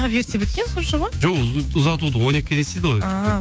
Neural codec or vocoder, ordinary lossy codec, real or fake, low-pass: codec, 16 kHz, 6 kbps, DAC; none; fake; none